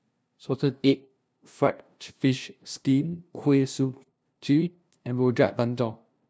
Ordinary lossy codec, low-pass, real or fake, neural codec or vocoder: none; none; fake; codec, 16 kHz, 0.5 kbps, FunCodec, trained on LibriTTS, 25 frames a second